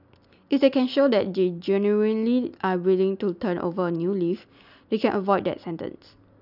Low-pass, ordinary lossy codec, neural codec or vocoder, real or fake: 5.4 kHz; none; none; real